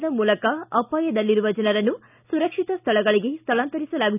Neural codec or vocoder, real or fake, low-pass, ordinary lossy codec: none; real; 3.6 kHz; none